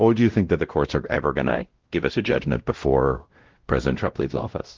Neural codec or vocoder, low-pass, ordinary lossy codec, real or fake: codec, 16 kHz, 0.5 kbps, X-Codec, WavLM features, trained on Multilingual LibriSpeech; 7.2 kHz; Opus, 16 kbps; fake